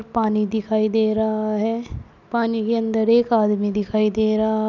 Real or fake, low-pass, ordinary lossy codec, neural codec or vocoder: real; 7.2 kHz; none; none